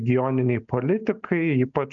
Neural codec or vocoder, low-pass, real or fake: none; 7.2 kHz; real